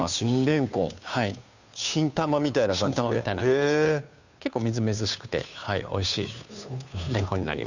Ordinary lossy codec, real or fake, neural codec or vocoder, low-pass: none; fake; codec, 16 kHz, 2 kbps, FunCodec, trained on Chinese and English, 25 frames a second; 7.2 kHz